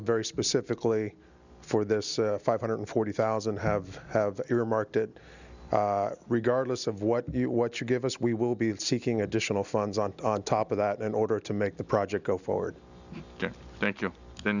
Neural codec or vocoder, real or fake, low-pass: none; real; 7.2 kHz